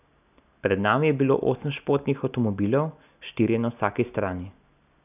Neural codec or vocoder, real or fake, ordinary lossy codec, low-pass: none; real; none; 3.6 kHz